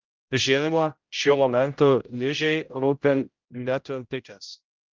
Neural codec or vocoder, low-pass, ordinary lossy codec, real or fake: codec, 16 kHz, 0.5 kbps, X-Codec, HuBERT features, trained on general audio; 7.2 kHz; Opus, 24 kbps; fake